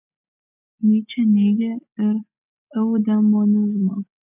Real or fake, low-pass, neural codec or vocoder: real; 3.6 kHz; none